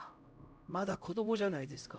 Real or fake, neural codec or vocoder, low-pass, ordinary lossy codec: fake; codec, 16 kHz, 0.5 kbps, X-Codec, HuBERT features, trained on LibriSpeech; none; none